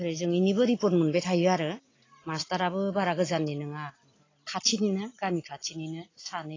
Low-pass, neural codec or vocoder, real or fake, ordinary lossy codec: 7.2 kHz; none; real; AAC, 32 kbps